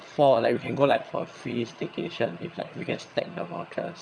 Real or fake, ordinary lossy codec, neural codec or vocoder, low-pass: fake; none; vocoder, 22.05 kHz, 80 mel bands, HiFi-GAN; none